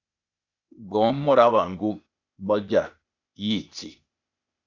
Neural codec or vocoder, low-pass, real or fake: codec, 16 kHz, 0.8 kbps, ZipCodec; 7.2 kHz; fake